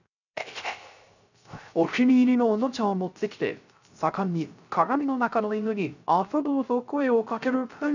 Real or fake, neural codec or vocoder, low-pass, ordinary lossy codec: fake; codec, 16 kHz, 0.3 kbps, FocalCodec; 7.2 kHz; none